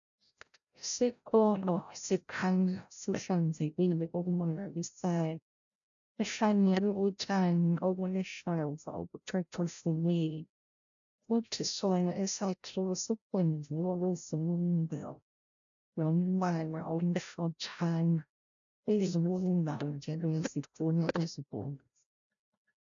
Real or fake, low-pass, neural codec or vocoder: fake; 7.2 kHz; codec, 16 kHz, 0.5 kbps, FreqCodec, larger model